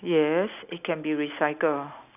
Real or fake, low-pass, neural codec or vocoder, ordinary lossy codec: real; 3.6 kHz; none; none